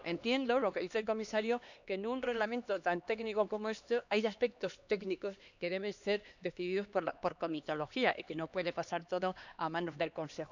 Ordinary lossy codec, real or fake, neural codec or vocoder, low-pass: none; fake; codec, 16 kHz, 2 kbps, X-Codec, HuBERT features, trained on LibriSpeech; 7.2 kHz